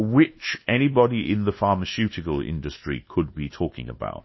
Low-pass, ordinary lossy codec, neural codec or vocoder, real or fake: 7.2 kHz; MP3, 24 kbps; codec, 24 kHz, 1.2 kbps, DualCodec; fake